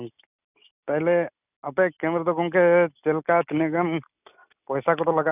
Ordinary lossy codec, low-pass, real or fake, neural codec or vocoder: none; 3.6 kHz; real; none